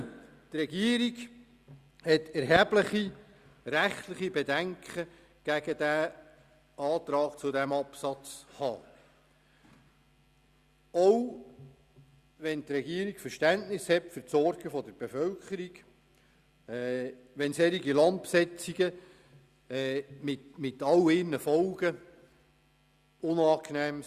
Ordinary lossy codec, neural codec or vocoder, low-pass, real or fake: Opus, 64 kbps; none; 14.4 kHz; real